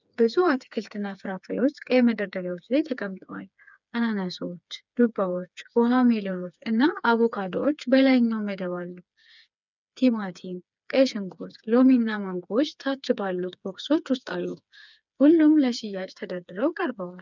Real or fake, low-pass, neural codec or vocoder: fake; 7.2 kHz; codec, 16 kHz, 4 kbps, FreqCodec, smaller model